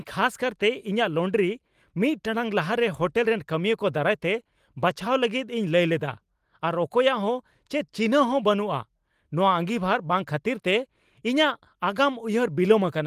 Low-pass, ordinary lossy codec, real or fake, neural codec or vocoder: 14.4 kHz; Opus, 24 kbps; real; none